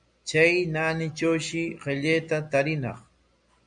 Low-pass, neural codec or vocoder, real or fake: 9.9 kHz; none; real